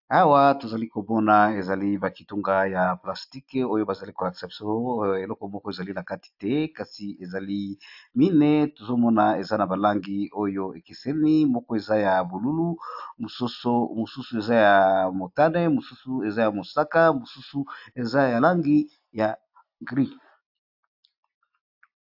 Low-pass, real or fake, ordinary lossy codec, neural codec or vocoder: 5.4 kHz; real; AAC, 48 kbps; none